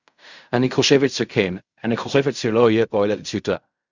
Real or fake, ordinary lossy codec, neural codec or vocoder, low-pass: fake; Opus, 64 kbps; codec, 16 kHz in and 24 kHz out, 0.4 kbps, LongCat-Audio-Codec, fine tuned four codebook decoder; 7.2 kHz